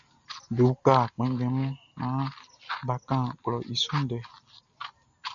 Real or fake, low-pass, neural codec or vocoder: real; 7.2 kHz; none